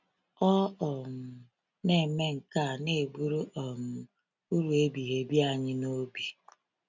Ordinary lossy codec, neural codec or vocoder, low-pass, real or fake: none; none; 7.2 kHz; real